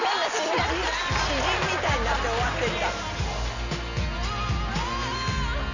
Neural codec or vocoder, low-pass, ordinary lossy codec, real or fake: none; 7.2 kHz; none; real